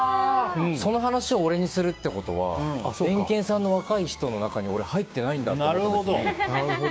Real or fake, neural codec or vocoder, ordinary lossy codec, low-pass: fake; codec, 16 kHz, 6 kbps, DAC; none; none